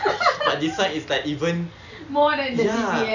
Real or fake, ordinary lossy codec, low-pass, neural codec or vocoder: real; none; 7.2 kHz; none